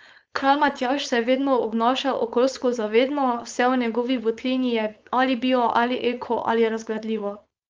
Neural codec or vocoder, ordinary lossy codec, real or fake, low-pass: codec, 16 kHz, 4.8 kbps, FACodec; Opus, 24 kbps; fake; 7.2 kHz